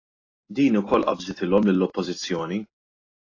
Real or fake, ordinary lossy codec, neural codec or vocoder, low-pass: real; AAC, 32 kbps; none; 7.2 kHz